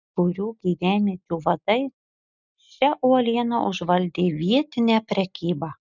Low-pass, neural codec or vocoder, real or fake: 7.2 kHz; none; real